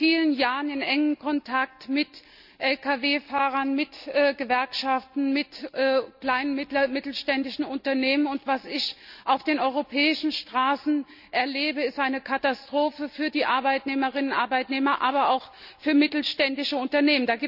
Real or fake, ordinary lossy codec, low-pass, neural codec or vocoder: real; none; 5.4 kHz; none